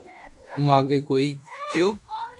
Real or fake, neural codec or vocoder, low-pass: fake; codec, 16 kHz in and 24 kHz out, 0.9 kbps, LongCat-Audio-Codec, fine tuned four codebook decoder; 10.8 kHz